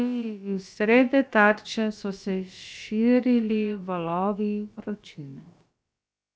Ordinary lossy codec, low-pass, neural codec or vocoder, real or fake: none; none; codec, 16 kHz, about 1 kbps, DyCAST, with the encoder's durations; fake